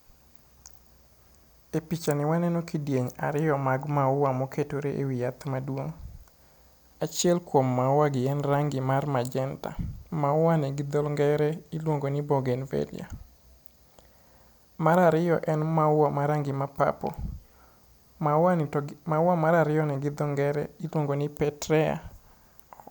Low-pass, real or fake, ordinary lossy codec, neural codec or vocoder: none; real; none; none